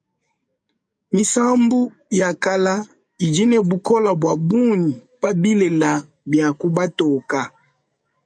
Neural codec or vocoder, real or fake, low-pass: codec, 44.1 kHz, 7.8 kbps, DAC; fake; 9.9 kHz